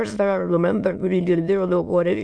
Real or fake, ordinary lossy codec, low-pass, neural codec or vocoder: fake; AAC, 64 kbps; 9.9 kHz; autoencoder, 22.05 kHz, a latent of 192 numbers a frame, VITS, trained on many speakers